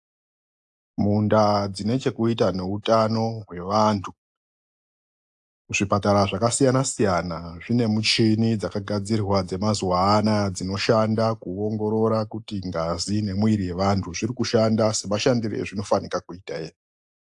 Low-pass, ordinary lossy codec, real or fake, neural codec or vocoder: 10.8 kHz; AAC, 64 kbps; real; none